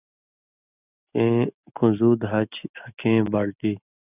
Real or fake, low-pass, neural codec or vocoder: real; 3.6 kHz; none